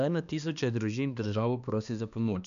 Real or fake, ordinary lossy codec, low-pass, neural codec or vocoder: fake; none; 7.2 kHz; codec, 16 kHz, 2 kbps, X-Codec, HuBERT features, trained on balanced general audio